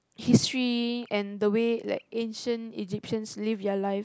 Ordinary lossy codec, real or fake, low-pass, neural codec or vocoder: none; real; none; none